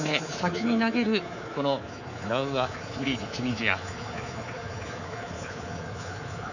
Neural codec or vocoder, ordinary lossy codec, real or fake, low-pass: codec, 24 kHz, 3.1 kbps, DualCodec; none; fake; 7.2 kHz